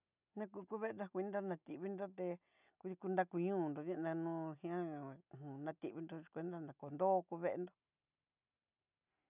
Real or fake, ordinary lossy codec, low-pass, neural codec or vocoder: real; none; 3.6 kHz; none